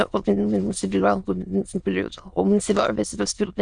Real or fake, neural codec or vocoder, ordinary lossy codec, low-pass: fake; autoencoder, 22.05 kHz, a latent of 192 numbers a frame, VITS, trained on many speakers; Opus, 64 kbps; 9.9 kHz